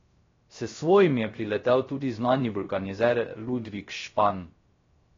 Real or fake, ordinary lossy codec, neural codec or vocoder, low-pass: fake; AAC, 32 kbps; codec, 16 kHz, 0.3 kbps, FocalCodec; 7.2 kHz